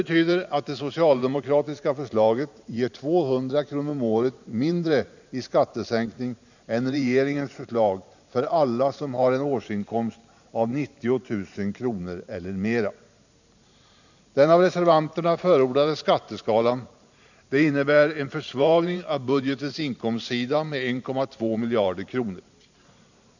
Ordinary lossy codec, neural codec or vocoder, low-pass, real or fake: none; vocoder, 44.1 kHz, 128 mel bands every 512 samples, BigVGAN v2; 7.2 kHz; fake